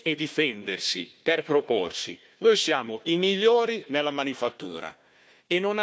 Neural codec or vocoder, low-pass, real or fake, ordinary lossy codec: codec, 16 kHz, 1 kbps, FunCodec, trained on Chinese and English, 50 frames a second; none; fake; none